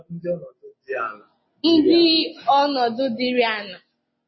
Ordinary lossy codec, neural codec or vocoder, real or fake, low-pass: MP3, 24 kbps; none; real; 7.2 kHz